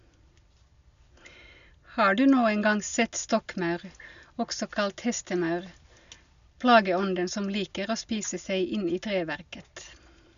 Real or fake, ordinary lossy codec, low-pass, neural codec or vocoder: real; none; 7.2 kHz; none